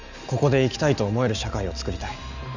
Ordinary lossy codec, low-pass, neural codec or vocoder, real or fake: none; 7.2 kHz; none; real